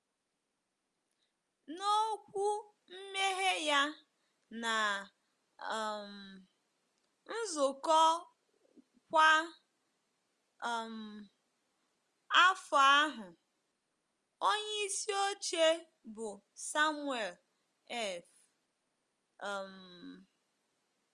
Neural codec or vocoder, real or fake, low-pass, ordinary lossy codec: none; real; 10.8 kHz; Opus, 32 kbps